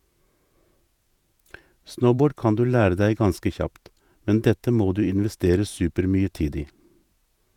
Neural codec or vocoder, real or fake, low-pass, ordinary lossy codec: vocoder, 48 kHz, 128 mel bands, Vocos; fake; 19.8 kHz; none